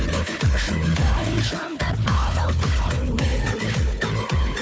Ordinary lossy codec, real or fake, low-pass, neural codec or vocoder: none; fake; none; codec, 16 kHz, 4 kbps, FunCodec, trained on Chinese and English, 50 frames a second